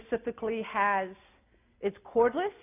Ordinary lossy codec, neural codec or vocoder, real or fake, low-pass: AAC, 24 kbps; none; real; 3.6 kHz